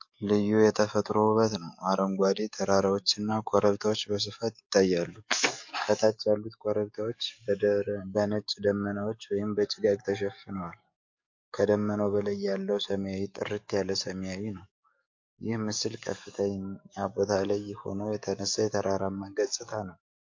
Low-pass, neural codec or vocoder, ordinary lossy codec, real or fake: 7.2 kHz; codec, 44.1 kHz, 7.8 kbps, DAC; MP3, 48 kbps; fake